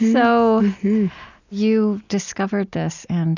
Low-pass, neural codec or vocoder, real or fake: 7.2 kHz; none; real